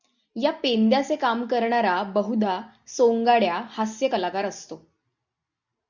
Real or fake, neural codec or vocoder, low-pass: real; none; 7.2 kHz